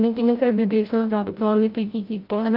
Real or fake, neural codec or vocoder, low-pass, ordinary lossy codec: fake; codec, 16 kHz, 0.5 kbps, FreqCodec, larger model; 5.4 kHz; Opus, 24 kbps